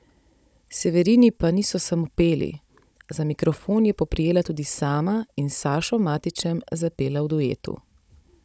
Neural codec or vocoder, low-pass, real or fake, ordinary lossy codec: codec, 16 kHz, 16 kbps, FunCodec, trained on Chinese and English, 50 frames a second; none; fake; none